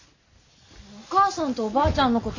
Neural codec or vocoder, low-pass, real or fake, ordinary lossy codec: none; 7.2 kHz; real; AAC, 48 kbps